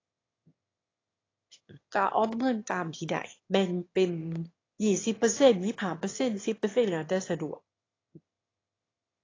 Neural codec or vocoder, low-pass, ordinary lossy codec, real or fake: autoencoder, 22.05 kHz, a latent of 192 numbers a frame, VITS, trained on one speaker; 7.2 kHz; AAC, 32 kbps; fake